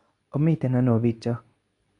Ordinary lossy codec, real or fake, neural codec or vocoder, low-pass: none; fake; codec, 24 kHz, 0.9 kbps, WavTokenizer, medium speech release version 2; 10.8 kHz